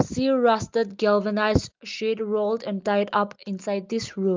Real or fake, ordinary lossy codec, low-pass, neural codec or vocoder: real; Opus, 32 kbps; 7.2 kHz; none